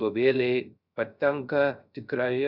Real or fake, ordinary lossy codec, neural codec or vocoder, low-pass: fake; Opus, 64 kbps; codec, 16 kHz, 0.3 kbps, FocalCodec; 5.4 kHz